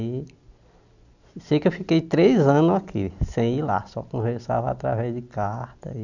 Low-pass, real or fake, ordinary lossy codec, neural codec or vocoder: 7.2 kHz; real; none; none